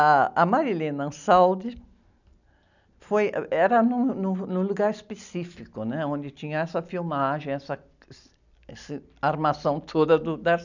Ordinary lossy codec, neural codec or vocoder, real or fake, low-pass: none; none; real; 7.2 kHz